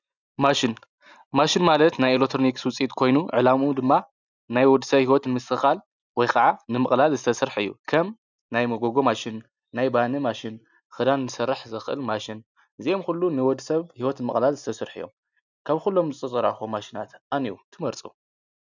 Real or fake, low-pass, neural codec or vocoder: real; 7.2 kHz; none